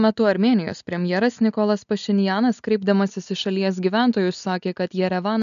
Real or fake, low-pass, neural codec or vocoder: real; 7.2 kHz; none